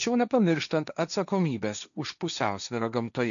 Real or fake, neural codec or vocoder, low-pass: fake; codec, 16 kHz, 1.1 kbps, Voila-Tokenizer; 7.2 kHz